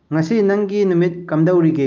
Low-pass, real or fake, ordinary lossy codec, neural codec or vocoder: 7.2 kHz; real; Opus, 24 kbps; none